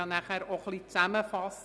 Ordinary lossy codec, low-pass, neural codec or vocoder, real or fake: none; none; none; real